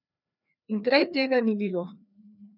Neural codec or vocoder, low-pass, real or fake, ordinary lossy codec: codec, 16 kHz, 2 kbps, FreqCodec, larger model; 5.4 kHz; fake; none